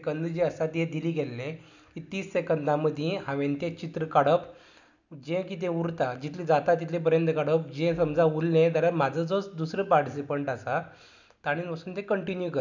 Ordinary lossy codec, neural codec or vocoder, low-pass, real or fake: none; none; 7.2 kHz; real